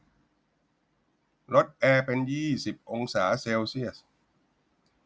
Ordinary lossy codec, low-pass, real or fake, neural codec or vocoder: none; none; real; none